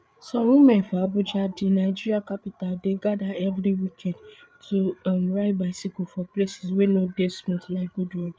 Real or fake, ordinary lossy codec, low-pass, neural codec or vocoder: fake; none; none; codec, 16 kHz, 8 kbps, FreqCodec, larger model